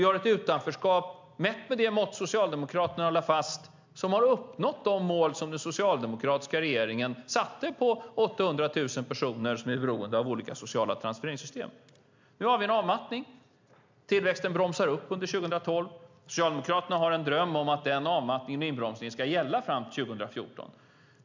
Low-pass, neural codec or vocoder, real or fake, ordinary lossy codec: 7.2 kHz; none; real; MP3, 64 kbps